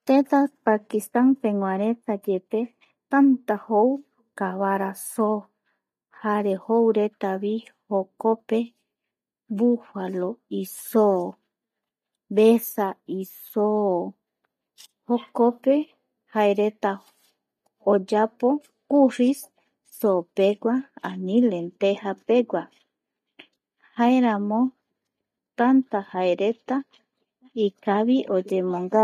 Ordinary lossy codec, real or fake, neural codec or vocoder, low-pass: MP3, 96 kbps; real; none; 14.4 kHz